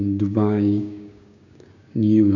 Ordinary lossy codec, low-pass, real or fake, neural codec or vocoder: none; 7.2 kHz; fake; codec, 16 kHz, 6 kbps, DAC